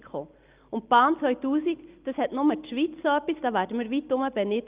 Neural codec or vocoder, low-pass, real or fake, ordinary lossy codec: none; 3.6 kHz; real; Opus, 32 kbps